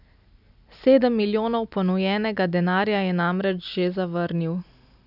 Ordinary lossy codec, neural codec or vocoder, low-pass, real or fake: none; none; 5.4 kHz; real